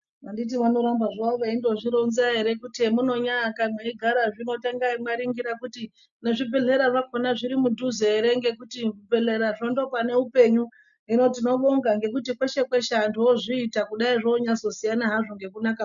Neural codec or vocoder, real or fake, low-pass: none; real; 7.2 kHz